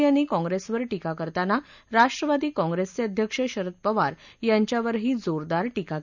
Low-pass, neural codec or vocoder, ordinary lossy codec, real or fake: 7.2 kHz; none; none; real